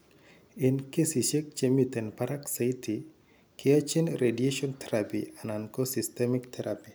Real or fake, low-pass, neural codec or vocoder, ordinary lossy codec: real; none; none; none